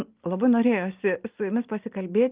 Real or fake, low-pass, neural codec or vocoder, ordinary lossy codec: real; 3.6 kHz; none; Opus, 32 kbps